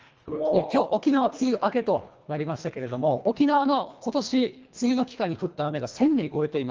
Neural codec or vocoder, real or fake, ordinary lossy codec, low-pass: codec, 24 kHz, 1.5 kbps, HILCodec; fake; Opus, 24 kbps; 7.2 kHz